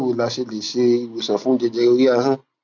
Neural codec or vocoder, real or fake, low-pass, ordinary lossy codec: none; real; 7.2 kHz; none